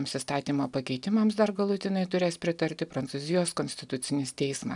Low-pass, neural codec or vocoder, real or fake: 10.8 kHz; none; real